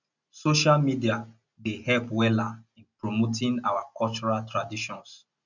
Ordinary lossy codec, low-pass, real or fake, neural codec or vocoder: none; 7.2 kHz; real; none